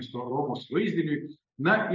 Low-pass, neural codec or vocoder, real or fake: 7.2 kHz; none; real